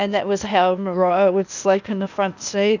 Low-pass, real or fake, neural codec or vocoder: 7.2 kHz; fake; codec, 16 kHz, 0.8 kbps, ZipCodec